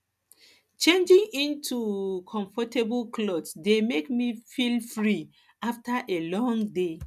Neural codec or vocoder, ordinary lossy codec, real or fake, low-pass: none; none; real; 14.4 kHz